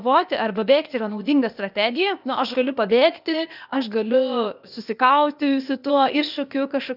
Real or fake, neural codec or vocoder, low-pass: fake; codec, 16 kHz, 0.8 kbps, ZipCodec; 5.4 kHz